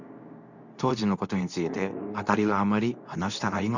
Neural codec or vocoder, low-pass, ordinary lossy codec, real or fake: codec, 24 kHz, 0.9 kbps, WavTokenizer, medium speech release version 2; 7.2 kHz; none; fake